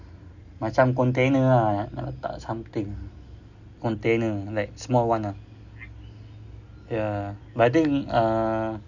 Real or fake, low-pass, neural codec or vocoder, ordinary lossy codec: real; 7.2 kHz; none; none